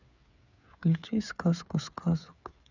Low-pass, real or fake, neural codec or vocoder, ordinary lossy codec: 7.2 kHz; fake; codec, 16 kHz, 8 kbps, FreqCodec, smaller model; none